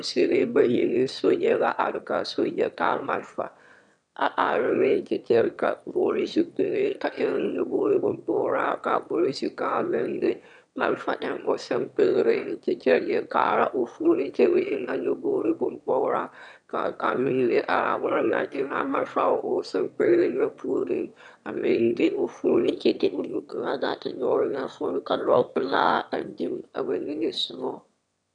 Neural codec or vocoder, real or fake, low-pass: autoencoder, 22.05 kHz, a latent of 192 numbers a frame, VITS, trained on one speaker; fake; 9.9 kHz